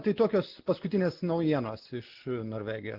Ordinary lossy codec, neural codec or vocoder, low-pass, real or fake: Opus, 16 kbps; none; 5.4 kHz; real